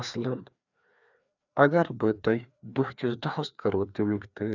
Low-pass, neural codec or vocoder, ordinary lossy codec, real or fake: 7.2 kHz; codec, 16 kHz, 2 kbps, FreqCodec, larger model; none; fake